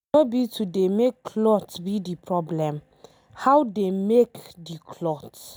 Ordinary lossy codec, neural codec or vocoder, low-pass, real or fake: none; none; none; real